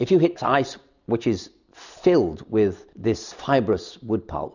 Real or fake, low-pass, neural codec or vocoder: real; 7.2 kHz; none